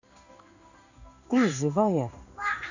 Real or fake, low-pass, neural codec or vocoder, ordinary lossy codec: fake; 7.2 kHz; codec, 16 kHz in and 24 kHz out, 1 kbps, XY-Tokenizer; none